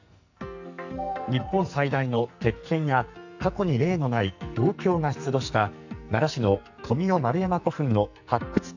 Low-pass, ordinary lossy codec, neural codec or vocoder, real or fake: 7.2 kHz; none; codec, 44.1 kHz, 2.6 kbps, SNAC; fake